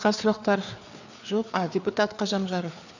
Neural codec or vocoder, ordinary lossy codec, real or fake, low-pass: codec, 16 kHz, 4 kbps, FreqCodec, larger model; none; fake; 7.2 kHz